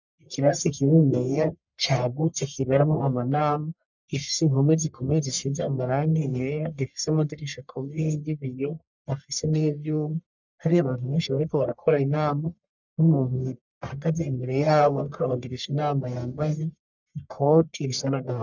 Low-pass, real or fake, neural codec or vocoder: 7.2 kHz; fake; codec, 44.1 kHz, 1.7 kbps, Pupu-Codec